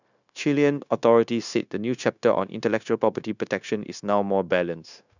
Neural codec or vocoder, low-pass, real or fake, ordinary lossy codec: codec, 16 kHz, 0.9 kbps, LongCat-Audio-Codec; 7.2 kHz; fake; none